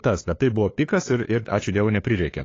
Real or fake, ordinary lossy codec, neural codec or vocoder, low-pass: fake; AAC, 32 kbps; codec, 16 kHz, 2 kbps, FunCodec, trained on LibriTTS, 25 frames a second; 7.2 kHz